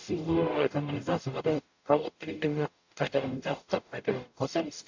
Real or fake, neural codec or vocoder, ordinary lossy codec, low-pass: fake; codec, 44.1 kHz, 0.9 kbps, DAC; AAC, 48 kbps; 7.2 kHz